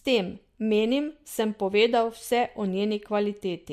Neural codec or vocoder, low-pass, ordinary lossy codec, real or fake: none; 14.4 kHz; MP3, 64 kbps; real